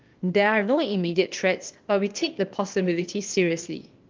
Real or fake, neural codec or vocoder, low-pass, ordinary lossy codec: fake; codec, 16 kHz, 0.8 kbps, ZipCodec; 7.2 kHz; Opus, 24 kbps